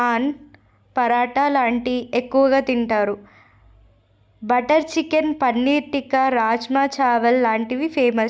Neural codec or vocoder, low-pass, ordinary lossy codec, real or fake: none; none; none; real